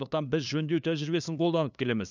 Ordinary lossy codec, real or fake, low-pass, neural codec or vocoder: none; fake; 7.2 kHz; codec, 16 kHz, 2 kbps, FunCodec, trained on LibriTTS, 25 frames a second